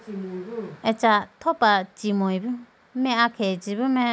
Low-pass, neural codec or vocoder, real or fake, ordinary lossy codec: none; none; real; none